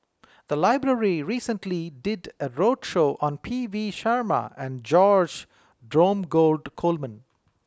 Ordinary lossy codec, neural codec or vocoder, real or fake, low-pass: none; none; real; none